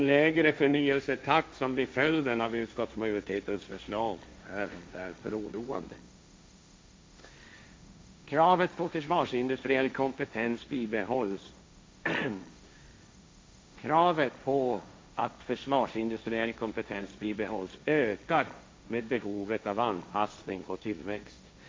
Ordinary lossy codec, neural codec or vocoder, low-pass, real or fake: none; codec, 16 kHz, 1.1 kbps, Voila-Tokenizer; none; fake